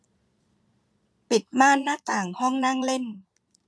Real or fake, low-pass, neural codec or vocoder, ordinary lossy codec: fake; none; vocoder, 22.05 kHz, 80 mel bands, Vocos; none